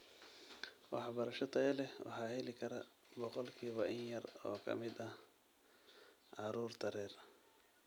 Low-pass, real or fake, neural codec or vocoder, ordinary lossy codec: none; real; none; none